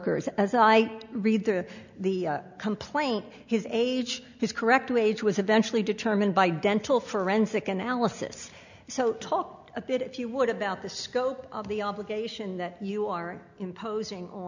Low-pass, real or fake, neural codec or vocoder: 7.2 kHz; real; none